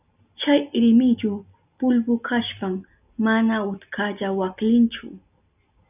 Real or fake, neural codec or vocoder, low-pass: real; none; 3.6 kHz